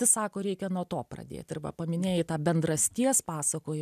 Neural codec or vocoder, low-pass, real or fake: none; 14.4 kHz; real